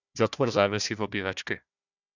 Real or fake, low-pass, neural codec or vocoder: fake; 7.2 kHz; codec, 16 kHz, 1 kbps, FunCodec, trained on Chinese and English, 50 frames a second